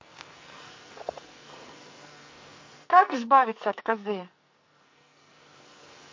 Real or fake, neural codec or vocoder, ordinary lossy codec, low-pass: fake; codec, 44.1 kHz, 2.6 kbps, SNAC; MP3, 48 kbps; 7.2 kHz